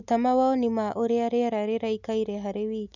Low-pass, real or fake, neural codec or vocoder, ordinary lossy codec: 7.2 kHz; real; none; none